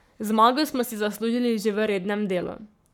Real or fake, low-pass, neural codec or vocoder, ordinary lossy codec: fake; 19.8 kHz; codec, 44.1 kHz, 7.8 kbps, Pupu-Codec; none